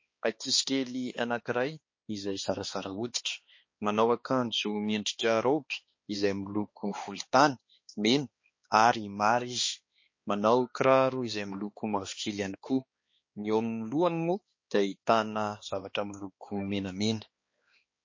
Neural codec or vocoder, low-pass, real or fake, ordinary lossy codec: codec, 16 kHz, 2 kbps, X-Codec, HuBERT features, trained on balanced general audio; 7.2 kHz; fake; MP3, 32 kbps